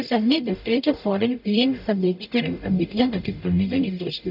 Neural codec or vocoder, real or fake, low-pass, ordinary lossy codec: codec, 44.1 kHz, 0.9 kbps, DAC; fake; 5.4 kHz; none